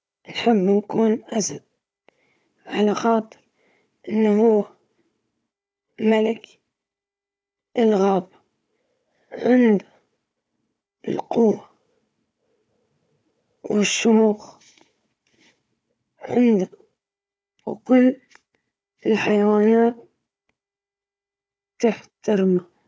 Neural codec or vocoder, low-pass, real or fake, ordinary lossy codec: codec, 16 kHz, 4 kbps, FunCodec, trained on Chinese and English, 50 frames a second; none; fake; none